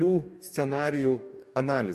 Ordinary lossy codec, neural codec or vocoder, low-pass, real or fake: MP3, 64 kbps; codec, 44.1 kHz, 2.6 kbps, DAC; 14.4 kHz; fake